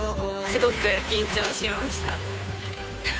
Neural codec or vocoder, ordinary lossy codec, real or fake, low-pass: codec, 16 kHz, 2 kbps, FunCodec, trained on Chinese and English, 25 frames a second; none; fake; none